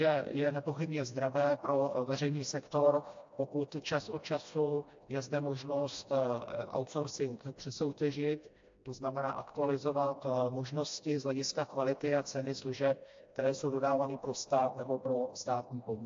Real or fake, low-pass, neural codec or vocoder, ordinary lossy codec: fake; 7.2 kHz; codec, 16 kHz, 1 kbps, FreqCodec, smaller model; AAC, 48 kbps